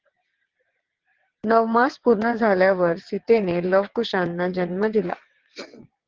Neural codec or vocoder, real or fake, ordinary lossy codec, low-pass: vocoder, 22.05 kHz, 80 mel bands, WaveNeXt; fake; Opus, 16 kbps; 7.2 kHz